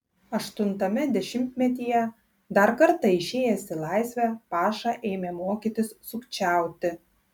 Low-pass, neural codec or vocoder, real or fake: 19.8 kHz; none; real